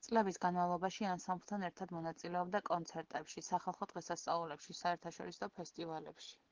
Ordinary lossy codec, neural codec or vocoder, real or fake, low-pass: Opus, 16 kbps; none; real; 7.2 kHz